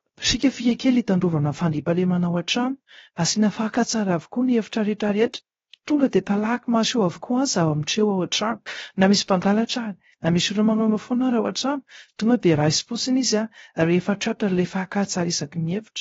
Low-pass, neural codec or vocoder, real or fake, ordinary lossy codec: 7.2 kHz; codec, 16 kHz, 0.3 kbps, FocalCodec; fake; AAC, 24 kbps